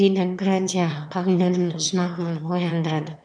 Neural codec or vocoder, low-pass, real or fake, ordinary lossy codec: autoencoder, 22.05 kHz, a latent of 192 numbers a frame, VITS, trained on one speaker; 9.9 kHz; fake; none